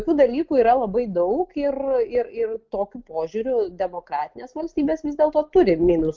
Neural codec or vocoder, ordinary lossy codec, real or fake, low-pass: none; Opus, 32 kbps; real; 7.2 kHz